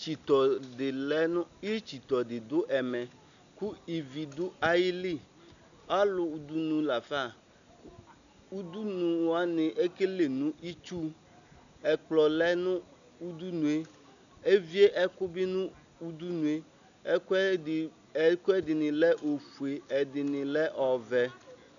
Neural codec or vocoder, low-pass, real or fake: none; 7.2 kHz; real